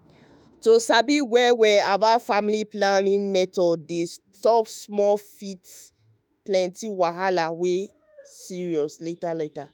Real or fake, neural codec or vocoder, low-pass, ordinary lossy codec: fake; autoencoder, 48 kHz, 32 numbers a frame, DAC-VAE, trained on Japanese speech; none; none